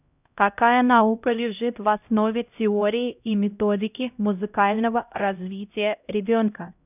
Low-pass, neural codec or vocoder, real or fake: 3.6 kHz; codec, 16 kHz, 0.5 kbps, X-Codec, HuBERT features, trained on LibriSpeech; fake